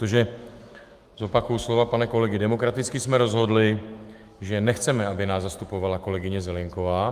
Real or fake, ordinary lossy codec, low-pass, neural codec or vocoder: fake; Opus, 32 kbps; 14.4 kHz; autoencoder, 48 kHz, 128 numbers a frame, DAC-VAE, trained on Japanese speech